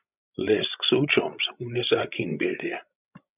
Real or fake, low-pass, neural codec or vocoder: fake; 3.6 kHz; codec, 16 kHz, 16 kbps, FreqCodec, larger model